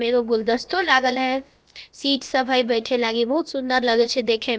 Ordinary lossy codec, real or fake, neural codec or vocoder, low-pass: none; fake; codec, 16 kHz, about 1 kbps, DyCAST, with the encoder's durations; none